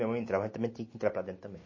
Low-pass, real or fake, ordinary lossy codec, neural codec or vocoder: 7.2 kHz; real; MP3, 32 kbps; none